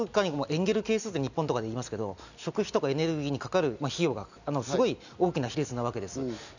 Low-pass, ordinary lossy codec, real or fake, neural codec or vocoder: 7.2 kHz; none; real; none